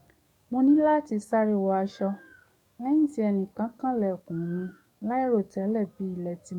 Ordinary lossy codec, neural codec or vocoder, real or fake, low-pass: none; codec, 44.1 kHz, 7.8 kbps, DAC; fake; 19.8 kHz